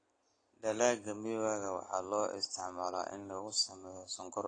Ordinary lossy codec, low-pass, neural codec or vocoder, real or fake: AAC, 32 kbps; 9.9 kHz; none; real